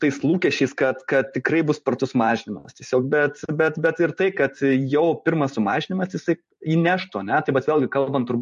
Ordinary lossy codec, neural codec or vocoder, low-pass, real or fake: MP3, 48 kbps; vocoder, 44.1 kHz, 128 mel bands every 512 samples, BigVGAN v2; 14.4 kHz; fake